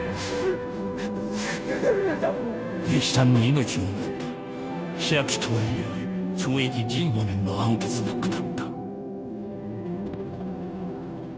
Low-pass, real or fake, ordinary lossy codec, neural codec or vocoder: none; fake; none; codec, 16 kHz, 0.5 kbps, FunCodec, trained on Chinese and English, 25 frames a second